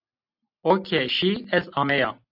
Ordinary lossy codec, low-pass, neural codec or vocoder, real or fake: AAC, 48 kbps; 5.4 kHz; none; real